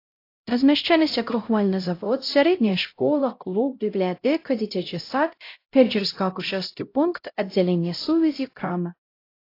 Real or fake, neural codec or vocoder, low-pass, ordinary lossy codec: fake; codec, 16 kHz, 0.5 kbps, X-Codec, HuBERT features, trained on LibriSpeech; 5.4 kHz; AAC, 32 kbps